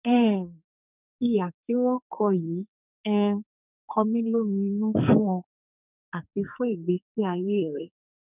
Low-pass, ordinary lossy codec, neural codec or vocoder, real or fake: 3.6 kHz; none; codec, 44.1 kHz, 2.6 kbps, SNAC; fake